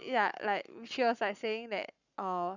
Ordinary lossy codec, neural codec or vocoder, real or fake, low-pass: none; codec, 44.1 kHz, 7.8 kbps, Pupu-Codec; fake; 7.2 kHz